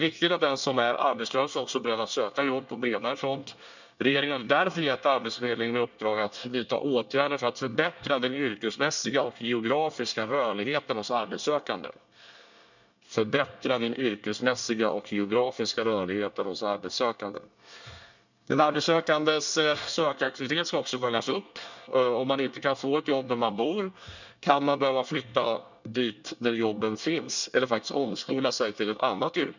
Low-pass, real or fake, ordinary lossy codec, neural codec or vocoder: 7.2 kHz; fake; none; codec, 24 kHz, 1 kbps, SNAC